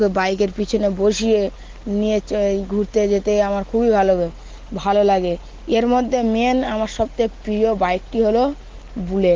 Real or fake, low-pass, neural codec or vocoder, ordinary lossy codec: real; 7.2 kHz; none; Opus, 16 kbps